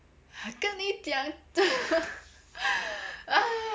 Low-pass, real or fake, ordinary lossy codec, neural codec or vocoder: none; real; none; none